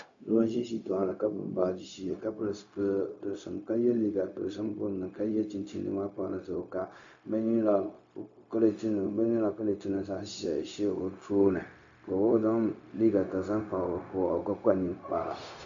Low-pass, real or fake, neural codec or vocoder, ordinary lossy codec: 7.2 kHz; fake; codec, 16 kHz, 0.4 kbps, LongCat-Audio-Codec; AAC, 64 kbps